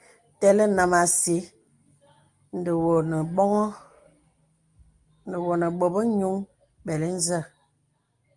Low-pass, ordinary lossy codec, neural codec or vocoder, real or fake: 10.8 kHz; Opus, 32 kbps; none; real